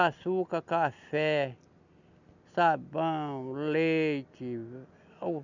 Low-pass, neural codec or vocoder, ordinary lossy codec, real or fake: 7.2 kHz; none; none; real